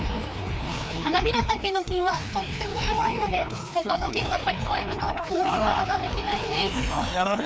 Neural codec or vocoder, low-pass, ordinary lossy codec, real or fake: codec, 16 kHz, 2 kbps, FreqCodec, larger model; none; none; fake